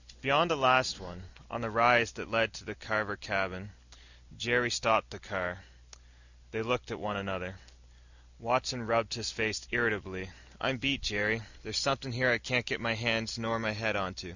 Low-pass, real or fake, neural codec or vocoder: 7.2 kHz; real; none